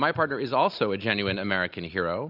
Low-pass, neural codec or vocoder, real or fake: 5.4 kHz; none; real